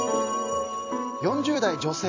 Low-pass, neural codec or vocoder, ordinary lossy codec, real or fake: 7.2 kHz; vocoder, 44.1 kHz, 128 mel bands every 256 samples, BigVGAN v2; AAC, 48 kbps; fake